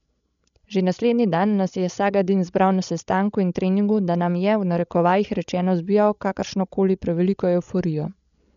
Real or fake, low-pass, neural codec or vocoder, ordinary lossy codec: fake; 7.2 kHz; codec, 16 kHz, 16 kbps, FreqCodec, larger model; none